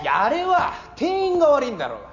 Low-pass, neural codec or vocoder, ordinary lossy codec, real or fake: 7.2 kHz; none; none; real